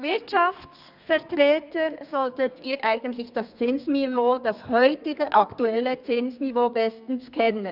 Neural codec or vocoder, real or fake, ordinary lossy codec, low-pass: codec, 32 kHz, 1.9 kbps, SNAC; fake; none; 5.4 kHz